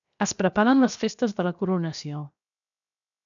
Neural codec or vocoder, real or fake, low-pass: codec, 16 kHz, 0.7 kbps, FocalCodec; fake; 7.2 kHz